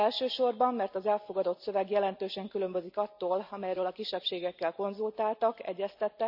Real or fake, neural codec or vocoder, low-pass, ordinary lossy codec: real; none; 5.4 kHz; none